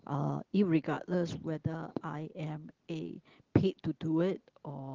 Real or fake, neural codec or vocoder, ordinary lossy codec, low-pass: real; none; Opus, 16 kbps; 7.2 kHz